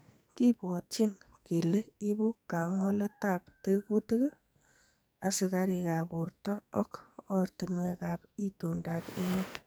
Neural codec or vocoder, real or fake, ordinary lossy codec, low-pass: codec, 44.1 kHz, 2.6 kbps, SNAC; fake; none; none